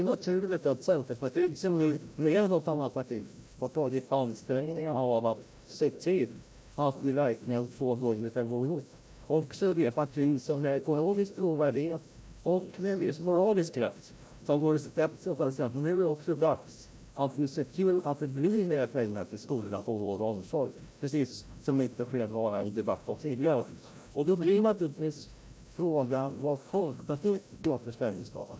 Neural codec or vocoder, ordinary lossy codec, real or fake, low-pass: codec, 16 kHz, 0.5 kbps, FreqCodec, larger model; none; fake; none